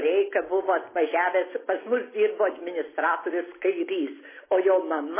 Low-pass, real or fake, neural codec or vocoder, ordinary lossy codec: 3.6 kHz; real; none; MP3, 16 kbps